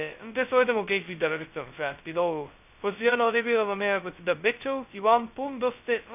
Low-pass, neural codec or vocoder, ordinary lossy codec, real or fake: 3.6 kHz; codec, 16 kHz, 0.2 kbps, FocalCodec; none; fake